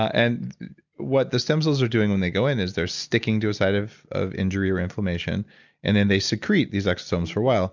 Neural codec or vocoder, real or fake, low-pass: none; real; 7.2 kHz